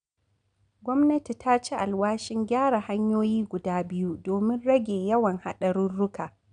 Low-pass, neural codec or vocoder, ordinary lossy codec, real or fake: 9.9 kHz; none; MP3, 96 kbps; real